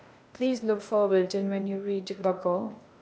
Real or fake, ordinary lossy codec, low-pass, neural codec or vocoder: fake; none; none; codec, 16 kHz, 0.8 kbps, ZipCodec